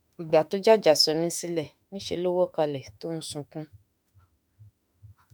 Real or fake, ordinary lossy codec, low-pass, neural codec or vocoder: fake; none; none; autoencoder, 48 kHz, 32 numbers a frame, DAC-VAE, trained on Japanese speech